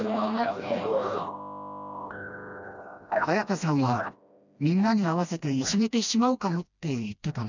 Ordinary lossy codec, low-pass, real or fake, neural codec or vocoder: none; 7.2 kHz; fake; codec, 16 kHz, 1 kbps, FreqCodec, smaller model